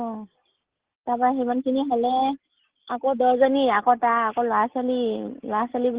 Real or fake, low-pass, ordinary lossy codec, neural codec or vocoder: real; 3.6 kHz; Opus, 16 kbps; none